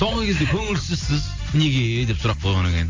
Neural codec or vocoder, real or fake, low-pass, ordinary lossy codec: none; real; 7.2 kHz; Opus, 32 kbps